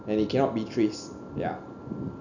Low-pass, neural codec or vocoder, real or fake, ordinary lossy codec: 7.2 kHz; none; real; none